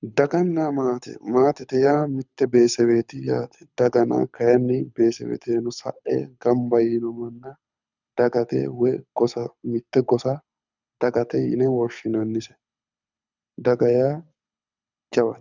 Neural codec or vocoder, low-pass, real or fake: codec, 24 kHz, 6 kbps, HILCodec; 7.2 kHz; fake